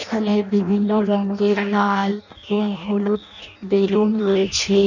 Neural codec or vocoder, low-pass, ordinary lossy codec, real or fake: codec, 16 kHz in and 24 kHz out, 0.6 kbps, FireRedTTS-2 codec; 7.2 kHz; none; fake